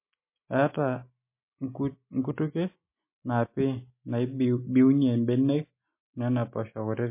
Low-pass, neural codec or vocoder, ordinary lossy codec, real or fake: 3.6 kHz; none; MP3, 24 kbps; real